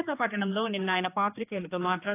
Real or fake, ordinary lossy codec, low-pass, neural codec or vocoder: fake; AAC, 24 kbps; 3.6 kHz; codec, 16 kHz, 2 kbps, X-Codec, HuBERT features, trained on general audio